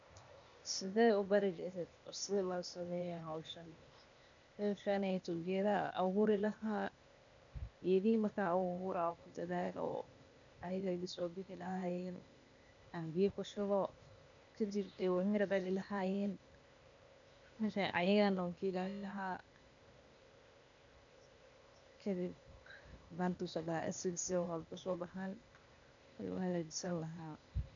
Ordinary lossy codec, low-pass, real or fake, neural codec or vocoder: none; 7.2 kHz; fake; codec, 16 kHz, 0.8 kbps, ZipCodec